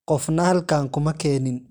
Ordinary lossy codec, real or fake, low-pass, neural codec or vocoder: none; real; none; none